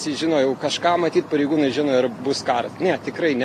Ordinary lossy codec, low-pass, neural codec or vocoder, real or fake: AAC, 48 kbps; 14.4 kHz; none; real